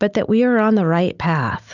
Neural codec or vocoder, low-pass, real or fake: none; 7.2 kHz; real